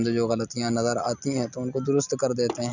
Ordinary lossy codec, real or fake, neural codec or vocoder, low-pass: none; real; none; 7.2 kHz